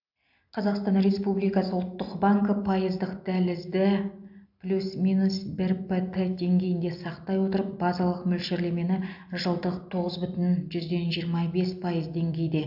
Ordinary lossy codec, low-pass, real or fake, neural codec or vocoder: AAC, 48 kbps; 5.4 kHz; real; none